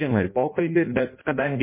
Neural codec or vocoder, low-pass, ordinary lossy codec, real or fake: codec, 16 kHz in and 24 kHz out, 0.6 kbps, FireRedTTS-2 codec; 3.6 kHz; MP3, 24 kbps; fake